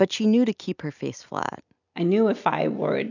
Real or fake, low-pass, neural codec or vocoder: real; 7.2 kHz; none